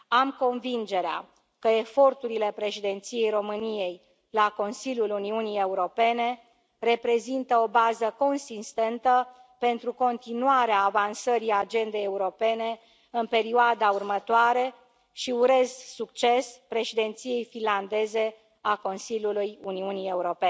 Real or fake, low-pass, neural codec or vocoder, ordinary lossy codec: real; none; none; none